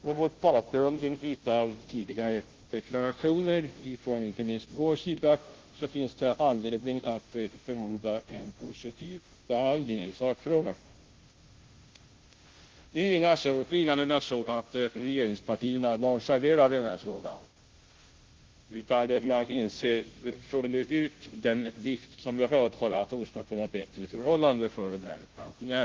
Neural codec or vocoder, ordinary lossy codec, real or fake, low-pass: codec, 16 kHz, 0.5 kbps, FunCodec, trained on Chinese and English, 25 frames a second; Opus, 16 kbps; fake; 7.2 kHz